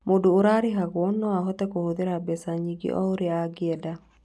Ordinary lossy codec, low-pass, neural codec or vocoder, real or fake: none; none; none; real